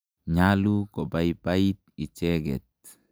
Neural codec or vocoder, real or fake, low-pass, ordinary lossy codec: none; real; none; none